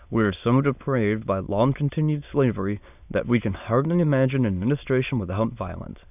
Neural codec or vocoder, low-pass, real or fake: autoencoder, 22.05 kHz, a latent of 192 numbers a frame, VITS, trained on many speakers; 3.6 kHz; fake